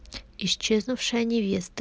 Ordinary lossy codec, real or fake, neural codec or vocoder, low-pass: none; real; none; none